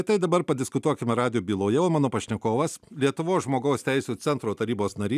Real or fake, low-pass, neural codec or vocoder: real; 14.4 kHz; none